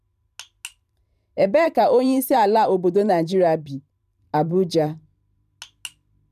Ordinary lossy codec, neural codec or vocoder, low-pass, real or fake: none; vocoder, 44.1 kHz, 128 mel bands every 512 samples, BigVGAN v2; 14.4 kHz; fake